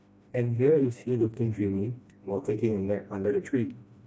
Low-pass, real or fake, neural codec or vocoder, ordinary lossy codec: none; fake; codec, 16 kHz, 1 kbps, FreqCodec, smaller model; none